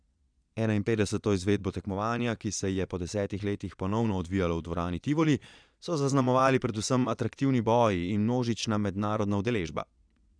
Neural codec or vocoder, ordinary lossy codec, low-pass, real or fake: vocoder, 22.05 kHz, 80 mel bands, Vocos; none; 9.9 kHz; fake